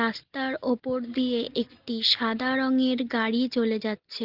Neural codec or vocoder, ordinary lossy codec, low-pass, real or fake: none; Opus, 16 kbps; 5.4 kHz; real